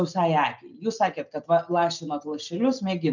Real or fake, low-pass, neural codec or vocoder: real; 7.2 kHz; none